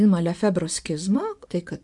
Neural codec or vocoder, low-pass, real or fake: vocoder, 24 kHz, 100 mel bands, Vocos; 10.8 kHz; fake